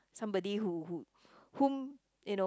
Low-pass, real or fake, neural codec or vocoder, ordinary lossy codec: none; real; none; none